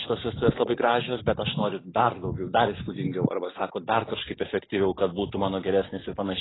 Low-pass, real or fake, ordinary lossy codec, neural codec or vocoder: 7.2 kHz; real; AAC, 16 kbps; none